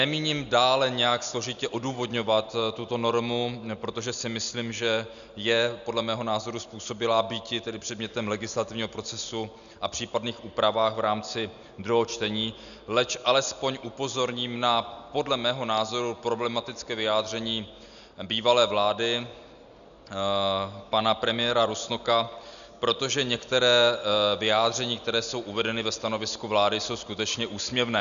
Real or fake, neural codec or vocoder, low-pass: real; none; 7.2 kHz